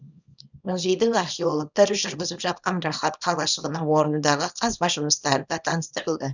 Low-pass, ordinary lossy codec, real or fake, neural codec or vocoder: 7.2 kHz; none; fake; codec, 24 kHz, 0.9 kbps, WavTokenizer, small release